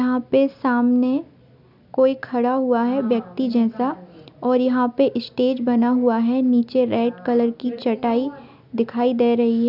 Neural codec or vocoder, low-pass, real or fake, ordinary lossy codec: none; 5.4 kHz; real; none